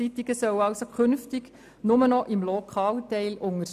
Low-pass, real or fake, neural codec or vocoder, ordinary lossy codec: 14.4 kHz; real; none; none